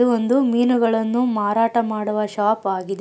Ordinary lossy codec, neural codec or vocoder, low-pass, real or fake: none; none; none; real